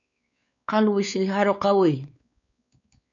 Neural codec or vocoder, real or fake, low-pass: codec, 16 kHz, 4 kbps, X-Codec, WavLM features, trained on Multilingual LibriSpeech; fake; 7.2 kHz